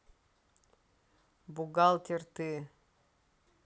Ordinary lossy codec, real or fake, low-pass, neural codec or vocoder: none; real; none; none